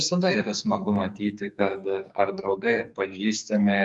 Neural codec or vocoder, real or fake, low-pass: codec, 44.1 kHz, 2.6 kbps, SNAC; fake; 10.8 kHz